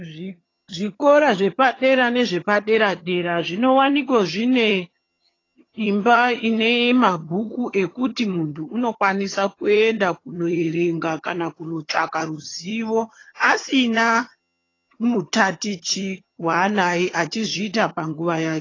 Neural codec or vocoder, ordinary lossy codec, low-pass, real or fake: vocoder, 22.05 kHz, 80 mel bands, HiFi-GAN; AAC, 32 kbps; 7.2 kHz; fake